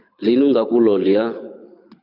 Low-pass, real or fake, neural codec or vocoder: 5.4 kHz; fake; codec, 24 kHz, 6 kbps, HILCodec